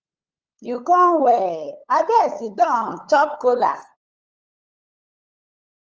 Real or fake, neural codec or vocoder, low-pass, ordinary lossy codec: fake; codec, 16 kHz, 8 kbps, FunCodec, trained on LibriTTS, 25 frames a second; 7.2 kHz; Opus, 24 kbps